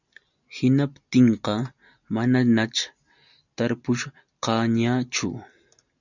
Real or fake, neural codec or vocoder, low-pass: real; none; 7.2 kHz